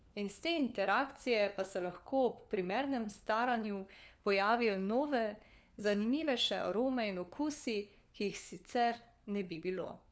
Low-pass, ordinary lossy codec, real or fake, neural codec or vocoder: none; none; fake; codec, 16 kHz, 4 kbps, FunCodec, trained on LibriTTS, 50 frames a second